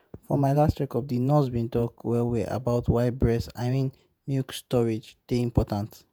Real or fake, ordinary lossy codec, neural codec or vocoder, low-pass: fake; none; vocoder, 48 kHz, 128 mel bands, Vocos; none